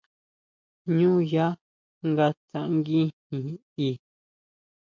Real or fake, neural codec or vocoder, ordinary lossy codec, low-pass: real; none; MP3, 64 kbps; 7.2 kHz